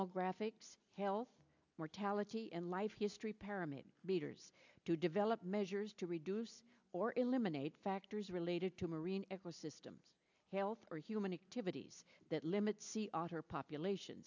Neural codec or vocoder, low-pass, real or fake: none; 7.2 kHz; real